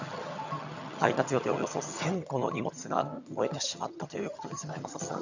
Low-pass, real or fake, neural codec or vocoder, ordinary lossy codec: 7.2 kHz; fake; vocoder, 22.05 kHz, 80 mel bands, HiFi-GAN; none